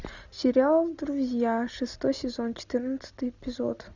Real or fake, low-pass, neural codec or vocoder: real; 7.2 kHz; none